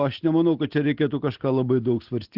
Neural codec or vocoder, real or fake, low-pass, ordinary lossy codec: none; real; 5.4 kHz; Opus, 16 kbps